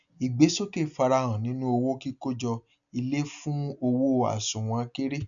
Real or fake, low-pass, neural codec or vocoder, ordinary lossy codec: real; 7.2 kHz; none; none